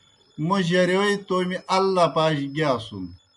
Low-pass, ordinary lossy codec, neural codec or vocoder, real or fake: 10.8 kHz; MP3, 96 kbps; none; real